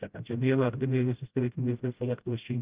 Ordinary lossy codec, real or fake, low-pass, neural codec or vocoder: Opus, 24 kbps; fake; 3.6 kHz; codec, 16 kHz, 0.5 kbps, FreqCodec, smaller model